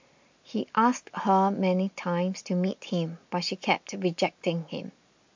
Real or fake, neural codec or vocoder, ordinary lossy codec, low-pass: real; none; MP3, 48 kbps; 7.2 kHz